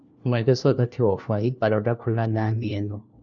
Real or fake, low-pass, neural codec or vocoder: fake; 7.2 kHz; codec, 16 kHz, 1 kbps, FunCodec, trained on LibriTTS, 50 frames a second